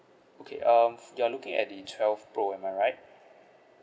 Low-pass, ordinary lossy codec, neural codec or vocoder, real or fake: none; none; none; real